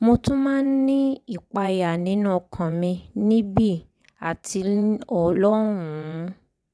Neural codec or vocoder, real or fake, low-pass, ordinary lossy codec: vocoder, 22.05 kHz, 80 mel bands, WaveNeXt; fake; none; none